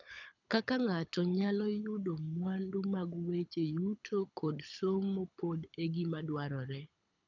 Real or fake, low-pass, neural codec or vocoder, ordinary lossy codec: fake; 7.2 kHz; codec, 24 kHz, 6 kbps, HILCodec; none